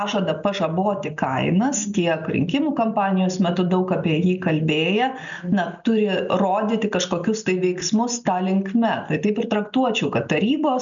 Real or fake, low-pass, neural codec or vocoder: real; 7.2 kHz; none